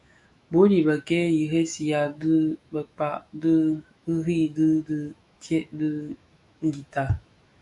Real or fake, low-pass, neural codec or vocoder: fake; 10.8 kHz; autoencoder, 48 kHz, 128 numbers a frame, DAC-VAE, trained on Japanese speech